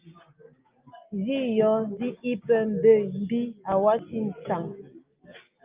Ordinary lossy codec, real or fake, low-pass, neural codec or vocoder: Opus, 24 kbps; real; 3.6 kHz; none